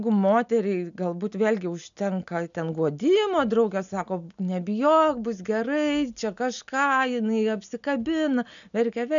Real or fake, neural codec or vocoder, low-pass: real; none; 7.2 kHz